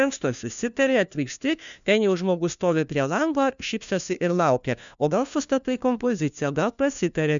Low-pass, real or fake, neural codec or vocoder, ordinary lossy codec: 7.2 kHz; fake; codec, 16 kHz, 1 kbps, FunCodec, trained on LibriTTS, 50 frames a second; MP3, 96 kbps